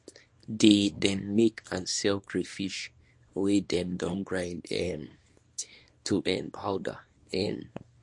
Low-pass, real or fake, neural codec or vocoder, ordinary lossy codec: 10.8 kHz; fake; codec, 24 kHz, 0.9 kbps, WavTokenizer, small release; MP3, 48 kbps